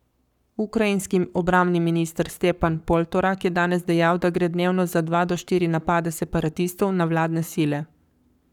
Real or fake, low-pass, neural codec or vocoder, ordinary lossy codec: fake; 19.8 kHz; codec, 44.1 kHz, 7.8 kbps, Pupu-Codec; none